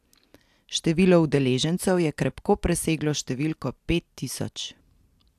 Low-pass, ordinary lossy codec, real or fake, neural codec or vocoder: 14.4 kHz; AAC, 96 kbps; real; none